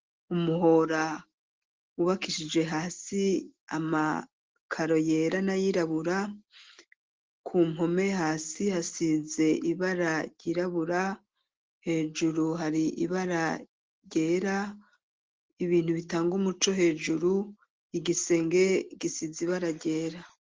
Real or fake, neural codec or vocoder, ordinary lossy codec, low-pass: real; none; Opus, 16 kbps; 7.2 kHz